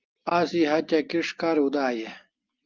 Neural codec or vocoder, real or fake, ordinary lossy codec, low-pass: none; real; Opus, 24 kbps; 7.2 kHz